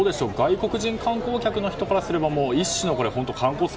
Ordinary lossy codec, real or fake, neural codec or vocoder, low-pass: none; real; none; none